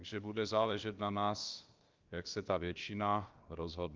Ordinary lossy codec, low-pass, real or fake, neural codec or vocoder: Opus, 32 kbps; 7.2 kHz; fake; codec, 16 kHz, about 1 kbps, DyCAST, with the encoder's durations